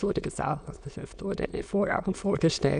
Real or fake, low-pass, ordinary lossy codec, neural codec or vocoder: fake; 9.9 kHz; none; autoencoder, 22.05 kHz, a latent of 192 numbers a frame, VITS, trained on many speakers